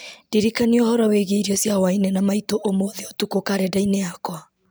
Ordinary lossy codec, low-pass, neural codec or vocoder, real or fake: none; none; none; real